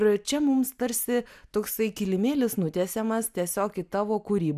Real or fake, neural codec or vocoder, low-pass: real; none; 14.4 kHz